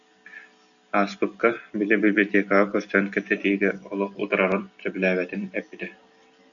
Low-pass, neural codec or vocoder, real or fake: 7.2 kHz; none; real